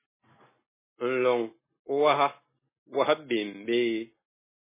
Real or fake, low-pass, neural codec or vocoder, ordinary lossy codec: real; 3.6 kHz; none; MP3, 16 kbps